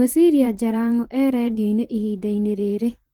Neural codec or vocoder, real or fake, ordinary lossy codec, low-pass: vocoder, 44.1 kHz, 128 mel bands, Pupu-Vocoder; fake; Opus, 16 kbps; 19.8 kHz